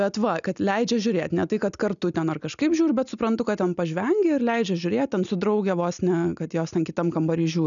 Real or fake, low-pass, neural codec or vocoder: real; 7.2 kHz; none